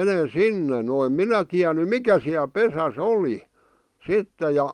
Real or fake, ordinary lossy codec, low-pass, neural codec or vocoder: real; Opus, 24 kbps; 19.8 kHz; none